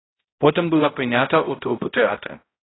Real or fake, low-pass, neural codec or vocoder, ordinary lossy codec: fake; 7.2 kHz; codec, 16 kHz, 0.5 kbps, X-Codec, HuBERT features, trained on balanced general audio; AAC, 16 kbps